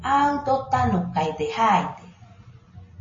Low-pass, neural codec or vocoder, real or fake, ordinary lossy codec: 7.2 kHz; none; real; MP3, 32 kbps